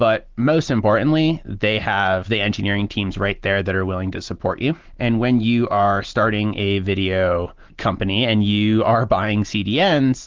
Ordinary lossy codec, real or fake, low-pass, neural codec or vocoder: Opus, 16 kbps; real; 7.2 kHz; none